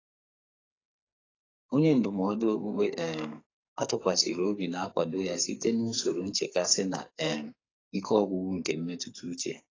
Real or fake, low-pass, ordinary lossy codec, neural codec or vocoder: fake; 7.2 kHz; AAC, 32 kbps; codec, 44.1 kHz, 2.6 kbps, SNAC